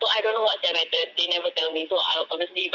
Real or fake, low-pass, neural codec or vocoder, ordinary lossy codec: fake; 7.2 kHz; vocoder, 22.05 kHz, 80 mel bands, WaveNeXt; none